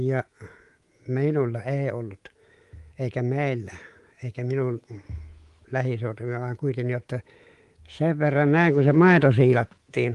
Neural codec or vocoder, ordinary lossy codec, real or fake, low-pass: codec, 24 kHz, 3.1 kbps, DualCodec; Opus, 24 kbps; fake; 10.8 kHz